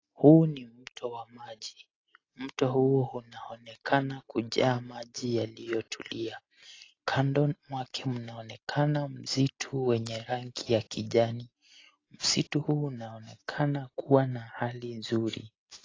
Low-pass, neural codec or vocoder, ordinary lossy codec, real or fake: 7.2 kHz; none; AAC, 32 kbps; real